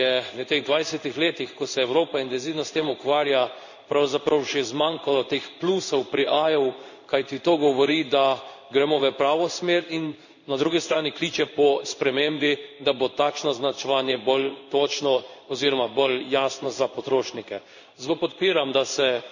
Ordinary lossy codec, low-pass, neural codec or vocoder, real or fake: none; 7.2 kHz; codec, 16 kHz in and 24 kHz out, 1 kbps, XY-Tokenizer; fake